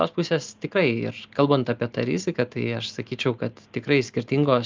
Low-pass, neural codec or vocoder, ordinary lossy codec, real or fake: 7.2 kHz; none; Opus, 24 kbps; real